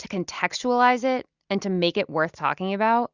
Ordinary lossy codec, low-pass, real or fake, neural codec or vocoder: Opus, 64 kbps; 7.2 kHz; real; none